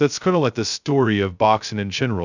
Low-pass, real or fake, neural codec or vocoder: 7.2 kHz; fake; codec, 16 kHz, 0.2 kbps, FocalCodec